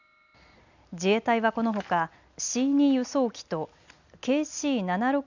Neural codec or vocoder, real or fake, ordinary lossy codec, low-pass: none; real; none; 7.2 kHz